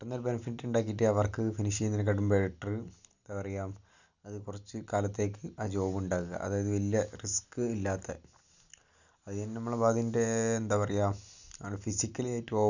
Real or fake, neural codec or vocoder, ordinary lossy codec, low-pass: real; none; none; 7.2 kHz